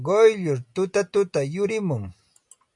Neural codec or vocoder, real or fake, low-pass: none; real; 10.8 kHz